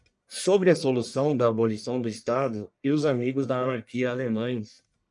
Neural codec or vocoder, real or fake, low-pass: codec, 44.1 kHz, 1.7 kbps, Pupu-Codec; fake; 10.8 kHz